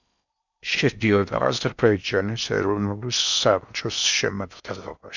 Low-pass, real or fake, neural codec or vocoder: 7.2 kHz; fake; codec, 16 kHz in and 24 kHz out, 0.6 kbps, FocalCodec, streaming, 4096 codes